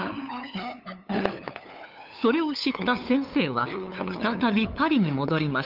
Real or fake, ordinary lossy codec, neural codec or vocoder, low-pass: fake; Opus, 24 kbps; codec, 16 kHz, 8 kbps, FunCodec, trained on LibriTTS, 25 frames a second; 5.4 kHz